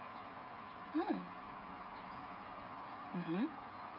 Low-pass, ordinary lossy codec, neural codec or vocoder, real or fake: 5.4 kHz; none; codec, 16 kHz, 8 kbps, FreqCodec, smaller model; fake